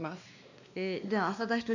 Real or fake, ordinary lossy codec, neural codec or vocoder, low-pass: fake; none; codec, 16 kHz, 2 kbps, X-Codec, WavLM features, trained on Multilingual LibriSpeech; 7.2 kHz